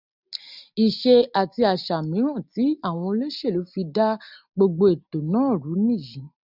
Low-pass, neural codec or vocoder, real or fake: 5.4 kHz; none; real